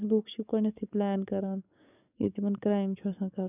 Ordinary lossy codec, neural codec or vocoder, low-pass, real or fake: none; codec, 44.1 kHz, 7.8 kbps, DAC; 3.6 kHz; fake